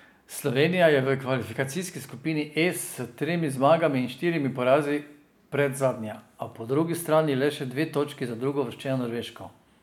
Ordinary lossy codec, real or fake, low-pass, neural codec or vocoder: none; real; 19.8 kHz; none